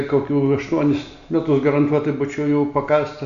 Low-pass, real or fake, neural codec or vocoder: 7.2 kHz; real; none